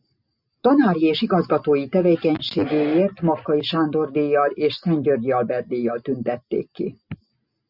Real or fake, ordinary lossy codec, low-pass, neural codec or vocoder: real; Opus, 64 kbps; 5.4 kHz; none